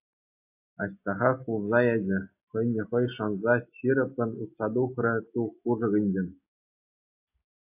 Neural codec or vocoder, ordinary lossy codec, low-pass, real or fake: none; AAC, 32 kbps; 3.6 kHz; real